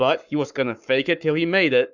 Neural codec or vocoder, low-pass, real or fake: codec, 44.1 kHz, 7.8 kbps, Pupu-Codec; 7.2 kHz; fake